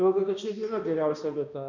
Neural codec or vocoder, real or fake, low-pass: codec, 16 kHz, 1 kbps, X-Codec, HuBERT features, trained on balanced general audio; fake; 7.2 kHz